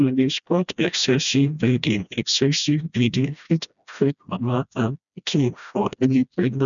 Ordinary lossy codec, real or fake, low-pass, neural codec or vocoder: none; fake; 7.2 kHz; codec, 16 kHz, 1 kbps, FreqCodec, smaller model